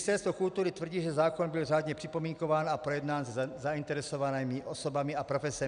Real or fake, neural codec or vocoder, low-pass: real; none; 9.9 kHz